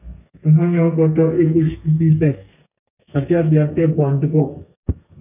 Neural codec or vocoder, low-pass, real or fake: codec, 32 kHz, 1.9 kbps, SNAC; 3.6 kHz; fake